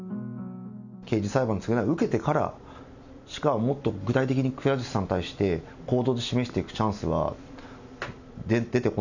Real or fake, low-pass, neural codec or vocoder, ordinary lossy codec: real; 7.2 kHz; none; none